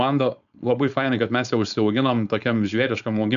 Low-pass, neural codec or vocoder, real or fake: 7.2 kHz; codec, 16 kHz, 4.8 kbps, FACodec; fake